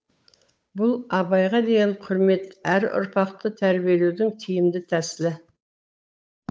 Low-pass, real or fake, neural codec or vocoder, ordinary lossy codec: none; fake; codec, 16 kHz, 8 kbps, FunCodec, trained on Chinese and English, 25 frames a second; none